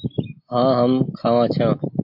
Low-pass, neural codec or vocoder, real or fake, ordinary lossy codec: 5.4 kHz; none; real; MP3, 48 kbps